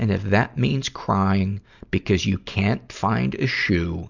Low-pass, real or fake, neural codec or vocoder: 7.2 kHz; real; none